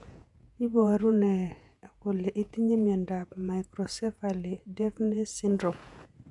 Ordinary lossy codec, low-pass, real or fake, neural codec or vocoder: none; 10.8 kHz; fake; vocoder, 48 kHz, 128 mel bands, Vocos